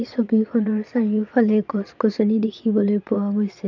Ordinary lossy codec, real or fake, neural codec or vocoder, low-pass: none; fake; vocoder, 22.05 kHz, 80 mel bands, Vocos; 7.2 kHz